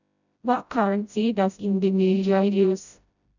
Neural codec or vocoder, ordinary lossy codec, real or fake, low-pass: codec, 16 kHz, 0.5 kbps, FreqCodec, smaller model; none; fake; 7.2 kHz